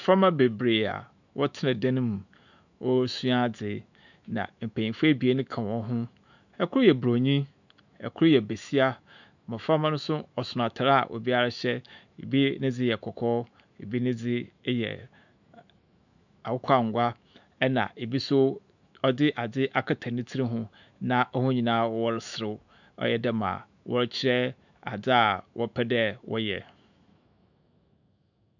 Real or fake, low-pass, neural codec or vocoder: fake; 7.2 kHz; autoencoder, 48 kHz, 128 numbers a frame, DAC-VAE, trained on Japanese speech